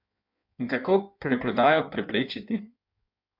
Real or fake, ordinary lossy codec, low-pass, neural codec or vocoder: fake; MP3, 48 kbps; 5.4 kHz; codec, 16 kHz in and 24 kHz out, 1.1 kbps, FireRedTTS-2 codec